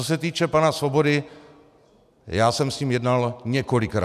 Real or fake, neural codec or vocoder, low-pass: real; none; 14.4 kHz